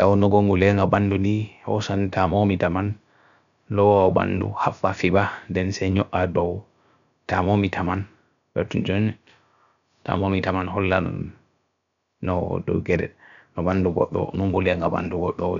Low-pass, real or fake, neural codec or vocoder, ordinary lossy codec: 7.2 kHz; fake; codec, 16 kHz, about 1 kbps, DyCAST, with the encoder's durations; none